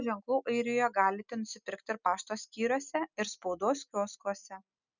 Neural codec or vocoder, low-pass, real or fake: none; 7.2 kHz; real